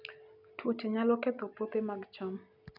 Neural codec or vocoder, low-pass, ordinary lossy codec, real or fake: none; 5.4 kHz; none; real